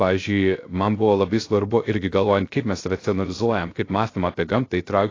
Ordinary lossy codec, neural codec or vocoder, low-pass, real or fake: AAC, 32 kbps; codec, 16 kHz, 0.3 kbps, FocalCodec; 7.2 kHz; fake